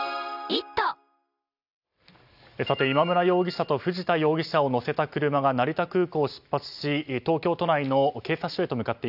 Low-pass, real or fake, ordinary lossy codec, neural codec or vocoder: 5.4 kHz; real; none; none